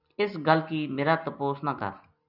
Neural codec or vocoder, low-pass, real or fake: none; 5.4 kHz; real